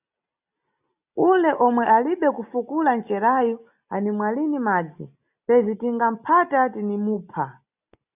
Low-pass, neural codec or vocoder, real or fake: 3.6 kHz; none; real